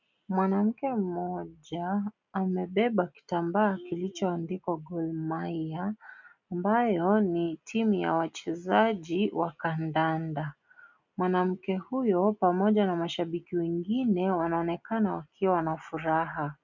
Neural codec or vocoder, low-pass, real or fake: none; 7.2 kHz; real